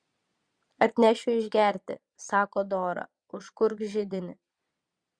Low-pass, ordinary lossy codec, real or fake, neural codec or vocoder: 9.9 kHz; Opus, 64 kbps; fake; vocoder, 22.05 kHz, 80 mel bands, Vocos